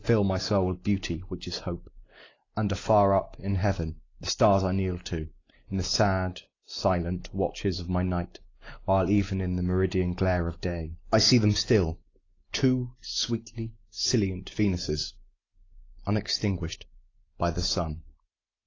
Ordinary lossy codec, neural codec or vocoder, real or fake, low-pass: AAC, 32 kbps; none; real; 7.2 kHz